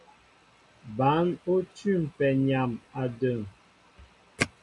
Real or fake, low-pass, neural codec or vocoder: real; 10.8 kHz; none